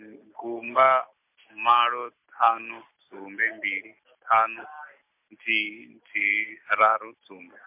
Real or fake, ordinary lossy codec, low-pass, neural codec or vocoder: real; MP3, 32 kbps; 3.6 kHz; none